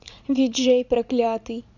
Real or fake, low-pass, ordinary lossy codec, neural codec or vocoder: fake; 7.2 kHz; none; vocoder, 44.1 kHz, 80 mel bands, Vocos